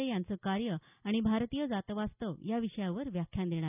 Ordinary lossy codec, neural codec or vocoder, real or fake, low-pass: none; none; real; 3.6 kHz